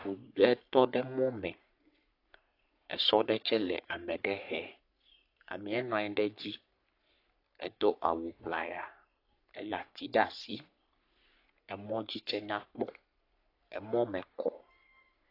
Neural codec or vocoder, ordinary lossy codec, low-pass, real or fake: codec, 44.1 kHz, 3.4 kbps, Pupu-Codec; AAC, 32 kbps; 5.4 kHz; fake